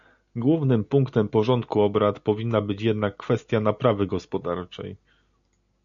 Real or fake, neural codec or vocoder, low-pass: real; none; 7.2 kHz